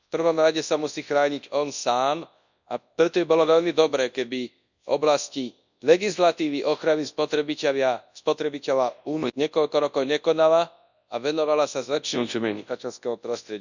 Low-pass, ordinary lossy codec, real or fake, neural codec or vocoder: 7.2 kHz; none; fake; codec, 24 kHz, 0.9 kbps, WavTokenizer, large speech release